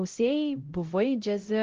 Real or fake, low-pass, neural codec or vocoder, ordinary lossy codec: fake; 7.2 kHz; codec, 16 kHz, 0.5 kbps, X-Codec, HuBERT features, trained on LibriSpeech; Opus, 16 kbps